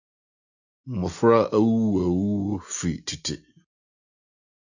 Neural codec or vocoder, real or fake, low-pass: none; real; 7.2 kHz